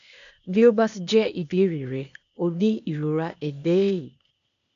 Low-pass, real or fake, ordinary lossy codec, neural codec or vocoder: 7.2 kHz; fake; none; codec, 16 kHz, 0.8 kbps, ZipCodec